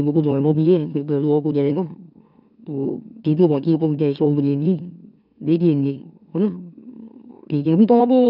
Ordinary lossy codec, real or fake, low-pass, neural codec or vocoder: none; fake; 5.4 kHz; autoencoder, 44.1 kHz, a latent of 192 numbers a frame, MeloTTS